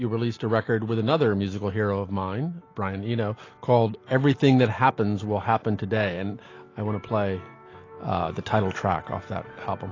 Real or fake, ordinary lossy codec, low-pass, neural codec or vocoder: real; AAC, 32 kbps; 7.2 kHz; none